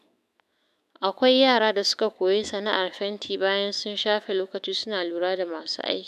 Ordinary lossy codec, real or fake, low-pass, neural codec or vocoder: none; fake; 14.4 kHz; autoencoder, 48 kHz, 128 numbers a frame, DAC-VAE, trained on Japanese speech